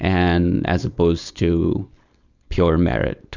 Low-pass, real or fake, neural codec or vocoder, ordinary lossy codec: 7.2 kHz; fake; codec, 16 kHz, 4.8 kbps, FACodec; Opus, 64 kbps